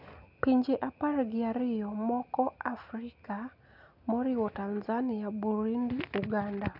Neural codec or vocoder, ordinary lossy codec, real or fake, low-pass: none; none; real; 5.4 kHz